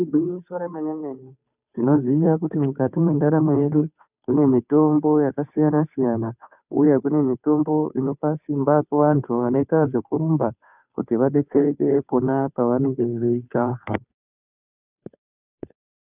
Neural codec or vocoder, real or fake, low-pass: codec, 16 kHz, 2 kbps, FunCodec, trained on Chinese and English, 25 frames a second; fake; 3.6 kHz